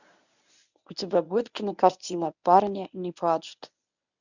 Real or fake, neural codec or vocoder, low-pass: fake; codec, 24 kHz, 0.9 kbps, WavTokenizer, medium speech release version 1; 7.2 kHz